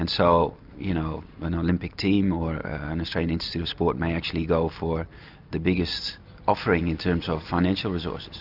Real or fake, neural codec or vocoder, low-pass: real; none; 5.4 kHz